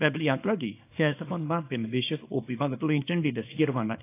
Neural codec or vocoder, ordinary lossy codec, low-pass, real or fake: codec, 24 kHz, 0.9 kbps, WavTokenizer, small release; AAC, 24 kbps; 3.6 kHz; fake